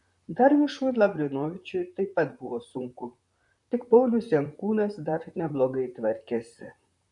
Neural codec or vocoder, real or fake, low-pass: vocoder, 44.1 kHz, 128 mel bands, Pupu-Vocoder; fake; 10.8 kHz